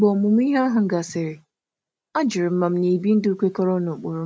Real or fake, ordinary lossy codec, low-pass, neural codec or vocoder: real; none; none; none